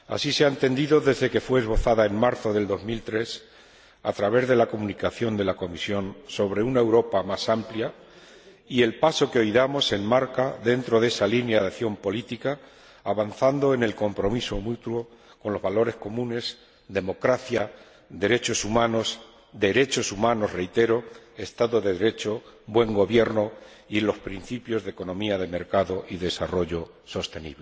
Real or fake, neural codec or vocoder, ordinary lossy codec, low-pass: real; none; none; none